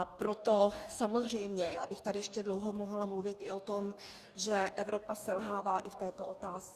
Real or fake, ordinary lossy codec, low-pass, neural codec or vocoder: fake; Opus, 64 kbps; 14.4 kHz; codec, 44.1 kHz, 2.6 kbps, DAC